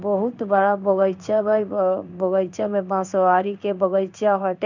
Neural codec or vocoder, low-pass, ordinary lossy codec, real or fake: codec, 16 kHz in and 24 kHz out, 1 kbps, XY-Tokenizer; 7.2 kHz; none; fake